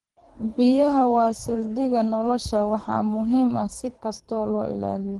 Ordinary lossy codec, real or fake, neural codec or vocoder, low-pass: Opus, 24 kbps; fake; codec, 24 kHz, 3 kbps, HILCodec; 10.8 kHz